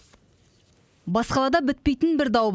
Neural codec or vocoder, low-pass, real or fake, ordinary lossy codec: none; none; real; none